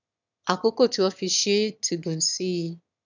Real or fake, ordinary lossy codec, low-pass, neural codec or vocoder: fake; none; 7.2 kHz; autoencoder, 22.05 kHz, a latent of 192 numbers a frame, VITS, trained on one speaker